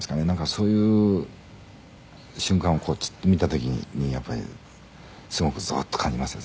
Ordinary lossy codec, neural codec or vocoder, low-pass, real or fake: none; none; none; real